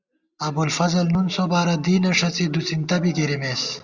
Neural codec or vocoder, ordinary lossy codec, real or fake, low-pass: none; Opus, 64 kbps; real; 7.2 kHz